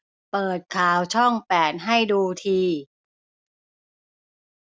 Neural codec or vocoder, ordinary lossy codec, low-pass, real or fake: none; none; none; real